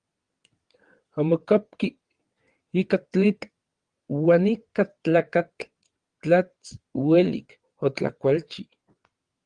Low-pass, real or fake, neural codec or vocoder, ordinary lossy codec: 9.9 kHz; fake; vocoder, 22.05 kHz, 80 mel bands, WaveNeXt; Opus, 24 kbps